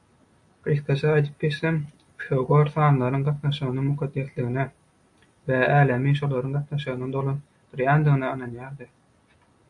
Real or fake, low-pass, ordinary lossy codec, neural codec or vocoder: real; 10.8 kHz; AAC, 64 kbps; none